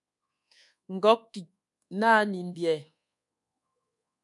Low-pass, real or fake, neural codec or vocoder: 10.8 kHz; fake; codec, 24 kHz, 1.2 kbps, DualCodec